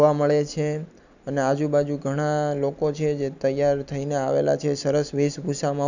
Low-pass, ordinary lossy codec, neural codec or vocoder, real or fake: 7.2 kHz; none; none; real